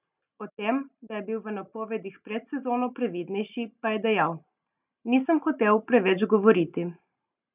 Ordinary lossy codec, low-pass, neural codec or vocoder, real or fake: none; 3.6 kHz; none; real